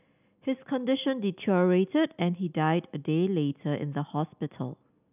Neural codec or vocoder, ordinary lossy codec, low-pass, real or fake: none; none; 3.6 kHz; real